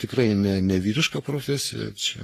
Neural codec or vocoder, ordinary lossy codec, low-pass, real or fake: codec, 44.1 kHz, 3.4 kbps, Pupu-Codec; AAC, 48 kbps; 14.4 kHz; fake